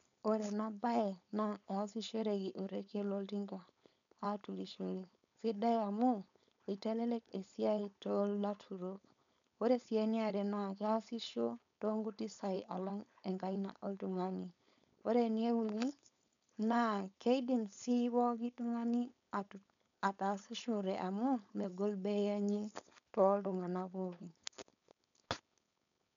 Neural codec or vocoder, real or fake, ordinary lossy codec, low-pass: codec, 16 kHz, 4.8 kbps, FACodec; fake; none; 7.2 kHz